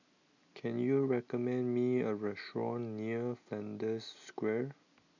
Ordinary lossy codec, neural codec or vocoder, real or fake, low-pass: none; none; real; 7.2 kHz